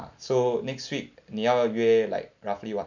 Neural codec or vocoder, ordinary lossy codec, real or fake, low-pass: none; none; real; 7.2 kHz